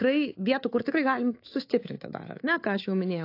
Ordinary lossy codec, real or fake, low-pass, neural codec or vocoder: AAC, 32 kbps; fake; 5.4 kHz; codec, 24 kHz, 3.1 kbps, DualCodec